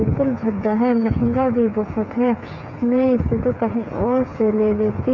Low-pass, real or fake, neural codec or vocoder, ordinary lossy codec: 7.2 kHz; fake; codec, 16 kHz, 8 kbps, FreqCodec, smaller model; MP3, 64 kbps